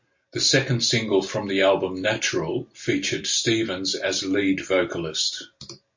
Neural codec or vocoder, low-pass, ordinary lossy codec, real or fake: none; 7.2 kHz; MP3, 48 kbps; real